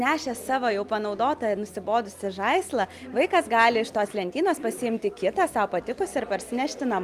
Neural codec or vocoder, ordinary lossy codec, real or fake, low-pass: none; Opus, 32 kbps; real; 14.4 kHz